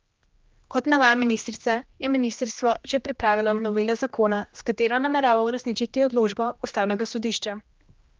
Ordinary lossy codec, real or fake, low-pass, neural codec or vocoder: Opus, 32 kbps; fake; 7.2 kHz; codec, 16 kHz, 1 kbps, X-Codec, HuBERT features, trained on general audio